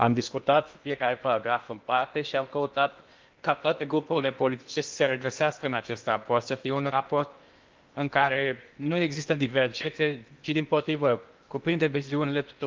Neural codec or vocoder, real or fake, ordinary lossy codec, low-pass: codec, 16 kHz in and 24 kHz out, 0.8 kbps, FocalCodec, streaming, 65536 codes; fake; Opus, 24 kbps; 7.2 kHz